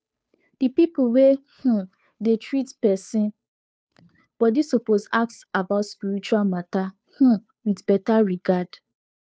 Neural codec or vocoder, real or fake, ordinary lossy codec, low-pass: codec, 16 kHz, 2 kbps, FunCodec, trained on Chinese and English, 25 frames a second; fake; none; none